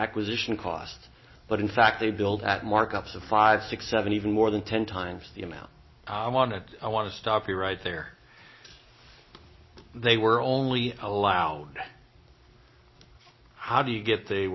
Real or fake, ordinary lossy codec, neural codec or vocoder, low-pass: real; MP3, 24 kbps; none; 7.2 kHz